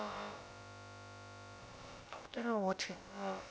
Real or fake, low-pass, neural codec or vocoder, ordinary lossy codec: fake; none; codec, 16 kHz, about 1 kbps, DyCAST, with the encoder's durations; none